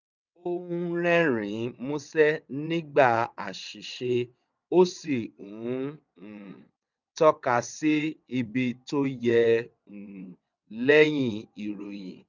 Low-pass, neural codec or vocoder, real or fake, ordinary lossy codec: 7.2 kHz; vocoder, 22.05 kHz, 80 mel bands, WaveNeXt; fake; none